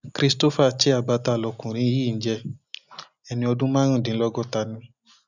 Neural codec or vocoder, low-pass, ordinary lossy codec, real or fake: none; 7.2 kHz; none; real